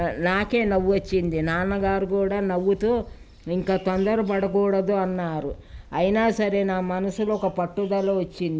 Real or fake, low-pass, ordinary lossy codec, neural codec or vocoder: real; none; none; none